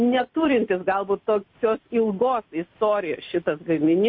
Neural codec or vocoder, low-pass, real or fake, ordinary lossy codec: none; 5.4 kHz; real; MP3, 32 kbps